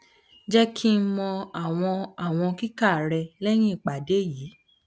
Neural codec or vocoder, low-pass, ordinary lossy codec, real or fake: none; none; none; real